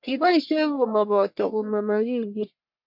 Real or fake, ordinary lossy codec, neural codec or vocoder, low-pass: fake; MP3, 48 kbps; codec, 44.1 kHz, 1.7 kbps, Pupu-Codec; 5.4 kHz